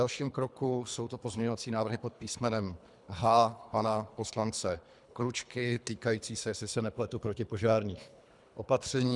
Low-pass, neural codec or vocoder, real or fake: 10.8 kHz; codec, 24 kHz, 3 kbps, HILCodec; fake